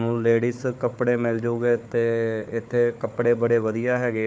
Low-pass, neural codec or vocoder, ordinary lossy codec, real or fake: none; codec, 16 kHz, 4 kbps, FunCodec, trained on Chinese and English, 50 frames a second; none; fake